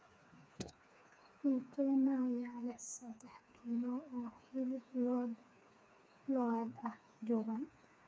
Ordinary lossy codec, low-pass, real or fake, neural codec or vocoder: none; none; fake; codec, 16 kHz, 4 kbps, FreqCodec, smaller model